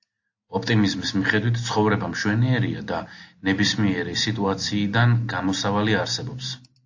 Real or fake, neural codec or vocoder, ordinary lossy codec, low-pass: real; none; AAC, 48 kbps; 7.2 kHz